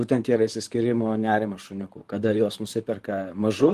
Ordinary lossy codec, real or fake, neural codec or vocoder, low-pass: Opus, 24 kbps; fake; vocoder, 44.1 kHz, 128 mel bands, Pupu-Vocoder; 14.4 kHz